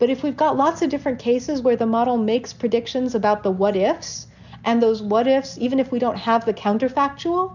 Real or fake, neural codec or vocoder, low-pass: real; none; 7.2 kHz